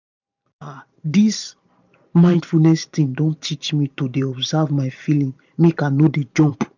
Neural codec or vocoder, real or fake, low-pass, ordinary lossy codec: vocoder, 44.1 kHz, 128 mel bands every 512 samples, BigVGAN v2; fake; 7.2 kHz; none